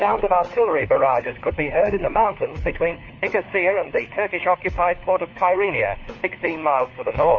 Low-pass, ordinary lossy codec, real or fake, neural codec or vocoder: 7.2 kHz; MP3, 32 kbps; fake; codec, 16 kHz, 4 kbps, FunCodec, trained on LibriTTS, 50 frames a second